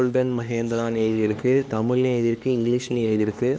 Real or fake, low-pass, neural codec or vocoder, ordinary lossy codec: fake; none; codec, 16 kHz, 2 kbps, X-Codec, HuBERT features, trained on LibriSpeech; none